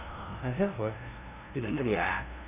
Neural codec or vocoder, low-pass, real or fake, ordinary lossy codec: codec, 16 kHz, 0.5 kbps, FunCodec, trained on LibriTTS, 25 frames a second; 3.6 kHz; fake; none